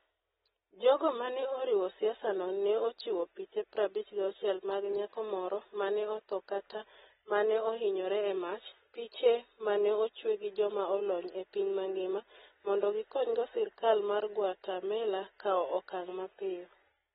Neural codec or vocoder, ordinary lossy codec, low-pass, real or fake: none; AAC, 16 kbps; 19.8 kHz; real